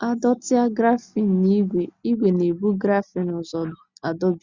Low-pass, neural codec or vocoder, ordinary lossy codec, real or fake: 7.2 kHz; none; Opus, 64 kbps; real